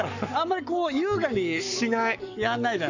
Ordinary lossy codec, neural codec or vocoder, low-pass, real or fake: none; codec, 44.1 kHz, 7.8 kbps, Pupu-Codec; 7.2 kHz; fake